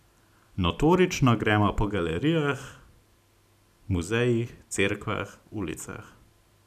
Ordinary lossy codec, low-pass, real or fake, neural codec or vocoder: none; 14.4 kHz; real; none